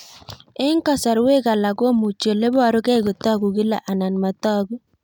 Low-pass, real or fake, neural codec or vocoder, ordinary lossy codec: 19.8 kHz; real; none; none